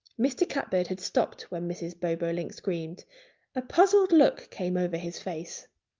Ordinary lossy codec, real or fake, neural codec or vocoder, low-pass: Opus, 32 kbps; real; none; 7.2 kHz